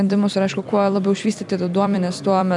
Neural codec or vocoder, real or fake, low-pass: vocoder, 44.1 kHz, 128 mel bands every 256 samples, BigVGAN v2; fake; 10.8 kHz